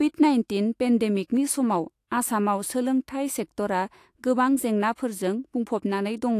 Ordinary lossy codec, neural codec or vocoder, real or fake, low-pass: AAC, 64 kbps; autoencoder, 48 kHz, 128 numbers a frame, DAC-VAE, trained on Japanese speech; fake; 14.4 kHz